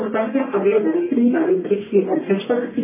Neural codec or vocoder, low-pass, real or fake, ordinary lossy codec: codec, 44.1 kHz, 1.7 kbps, Pupu-Codec; 3.6 kHz; fake; MP3, 16 kbps